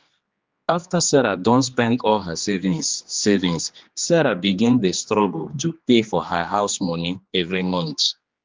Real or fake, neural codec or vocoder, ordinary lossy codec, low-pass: fake; codec, 16 kHz, 2 kbps, X-Codec, HuBERT features, trained on general audio; Opus, 32 kbps; 7.2 kHz